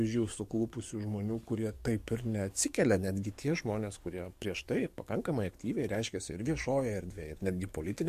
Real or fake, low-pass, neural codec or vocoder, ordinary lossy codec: fake; 14.4 kHz; codec, 44.1 kHz, 7.8 kbps, DAC; MP3, 64 kbps